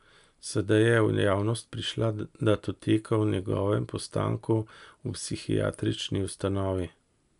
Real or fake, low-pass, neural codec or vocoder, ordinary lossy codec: real; 10.8 kHz; none; none